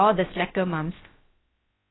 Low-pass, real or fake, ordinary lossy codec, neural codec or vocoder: 7.2 kHz; fake; AAC, 16 kbps; codec, 16 kHz, about 1 kbps, DyCAST, with the encoder's durations